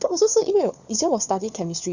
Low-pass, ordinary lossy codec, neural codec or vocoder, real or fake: 7.2 kHz; none; codec, 16 kHz, 4 kbps, FunCodec, trained on LibriTTS, 50 frames a second; fake